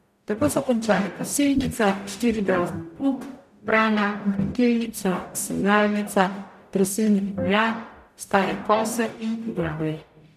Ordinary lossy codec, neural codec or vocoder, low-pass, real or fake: none; codec, 44.1 kHz, 0.9 kbps, DAC; 14.4 kHz; fake